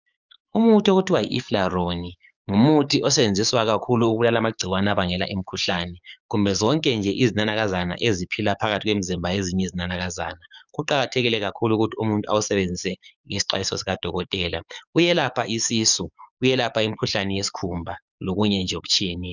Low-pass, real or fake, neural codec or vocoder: 7.2 kHz; fake; codec, 44.1 kHz, 7.8 kbps, DAC